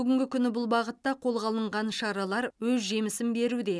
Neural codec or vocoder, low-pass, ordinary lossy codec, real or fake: none; none; none; real